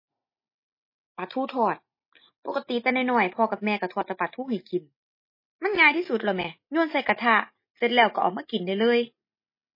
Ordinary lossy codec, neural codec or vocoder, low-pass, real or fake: MP3, 24 kbps; none; 5.4 kHz; real